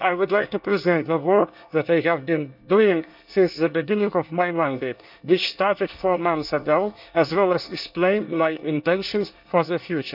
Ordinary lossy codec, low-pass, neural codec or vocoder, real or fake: none; 5.4 kHz; codec, 24 kHz, 1 kbps, SNAC; fake